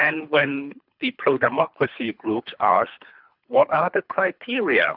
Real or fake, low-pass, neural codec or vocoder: fake; 5.4 kHz; codec, 24 kHz, 3 kbps, HILCodec